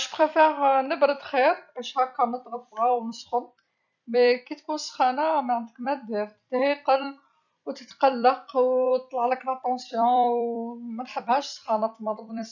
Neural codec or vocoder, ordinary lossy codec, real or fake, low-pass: vocoder, 44.1 kHz, 128 mel bands every 256 samples, BigVGAN v2; none; fake; 7.2 kHz